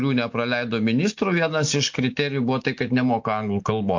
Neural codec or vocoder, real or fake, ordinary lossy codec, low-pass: none; real; MP3, 48 kbps; 7.2 kHz